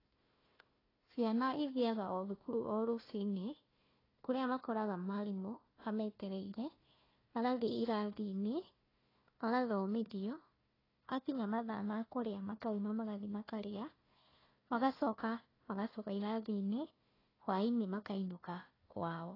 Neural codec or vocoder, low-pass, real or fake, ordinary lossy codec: codec, 16 kHz, 1 kbps, FunCodec, trained on Chinese and English, 50 frames a second; 5.4 kHz; fake; AAC, 24 kbps